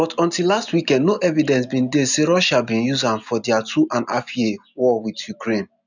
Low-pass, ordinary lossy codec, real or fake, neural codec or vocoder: 7.2 kHz; none; real; none